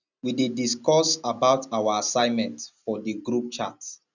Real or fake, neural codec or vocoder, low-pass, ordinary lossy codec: real; none; 7.2 kHz; none